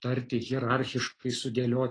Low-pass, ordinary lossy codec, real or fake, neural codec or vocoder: 9.9 kHz; AAC, 32 kbps; real; none